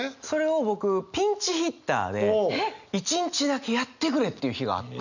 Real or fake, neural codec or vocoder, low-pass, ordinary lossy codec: real; none; 7.2 kHz; none